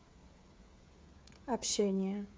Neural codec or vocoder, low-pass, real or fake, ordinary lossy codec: codec, 16 kHz, 8 kbps, FreqCodec, smaller model; none; fake; none